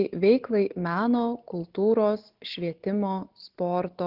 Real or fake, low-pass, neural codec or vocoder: real; 5.4 kHz; none